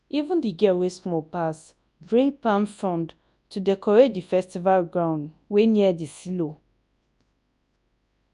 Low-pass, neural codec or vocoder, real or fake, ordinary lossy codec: 10.8 kHz; codec, 24 kHz, 0.9 kbps, WavTokenizer, large speech release; fake; none